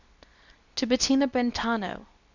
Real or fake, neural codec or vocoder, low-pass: fake; codec, 24 kHz, 0.9 kbps, WavTokenizer, medium speech release version 2; 7.2 kHz